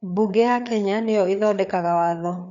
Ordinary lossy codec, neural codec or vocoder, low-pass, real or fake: none; codec, 16 kHz, 4 kbps, FreqCodec, larger model; 7.2 kHz; fake